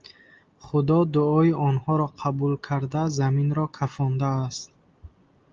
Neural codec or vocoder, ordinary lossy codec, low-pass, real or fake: none; Opus, 32 kbps; 7.2 kHz; real